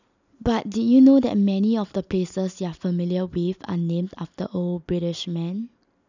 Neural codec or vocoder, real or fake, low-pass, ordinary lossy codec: none; real; 7.2 kHz; none